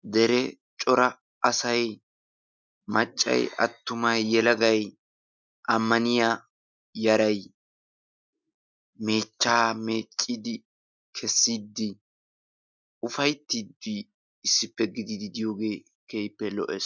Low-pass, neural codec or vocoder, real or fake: 7.2 kHz; none; real